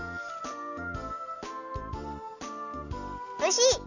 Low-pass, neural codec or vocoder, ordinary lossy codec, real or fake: 7.2 kHz; none; none; real